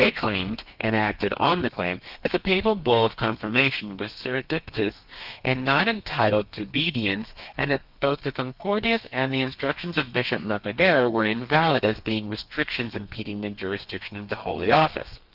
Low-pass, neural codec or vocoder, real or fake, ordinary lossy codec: 5.4 kHz; codec, 32 kHz, 1.9 kbps, SNAC; fake; Opus, 24 kbps